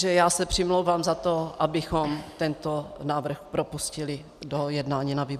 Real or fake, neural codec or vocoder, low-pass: real; none; 14.4 kHz